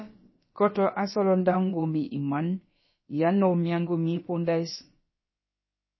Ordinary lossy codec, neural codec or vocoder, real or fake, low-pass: MP3, 24 kbps; codec, 16 kHz, about 1 kbps, DyCAST, with the encoder's durations; fake; 7.2 kHz